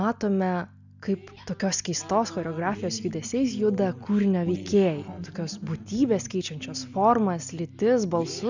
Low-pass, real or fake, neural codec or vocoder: 7.2 kHz; real; none